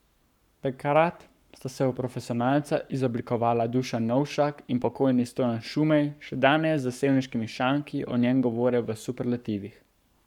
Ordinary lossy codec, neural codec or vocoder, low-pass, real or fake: none; codec, 44.1 kHz, 7.8 kbps, Pupu-Codec; 19.8 kHz; fake